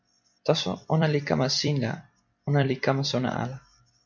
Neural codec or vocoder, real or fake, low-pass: vocoder, 44.1 kHz, 128 mel bands every 256 samples, BigVGAN v2; fake; 7.2 kHz